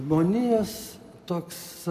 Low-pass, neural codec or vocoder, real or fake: 14.4 kHz; none; real